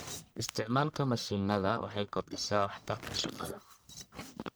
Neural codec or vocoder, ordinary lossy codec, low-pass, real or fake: codec, 44.1 kHz, 1.7 kbps, Pupu-Codec; none; none; fake